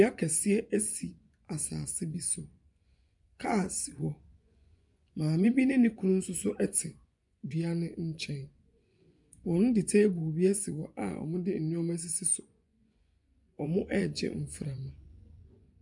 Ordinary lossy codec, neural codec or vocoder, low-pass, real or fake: MP3, 96 kbps; vocoder, 24 kHz, 100 mel bands, Vocos; 10.8 kHz; fake